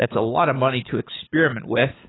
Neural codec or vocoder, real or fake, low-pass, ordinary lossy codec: codec, 24 kHz, 6 kbps, HILCodec; fake; 7.2 kHz; AAC, 16 kbps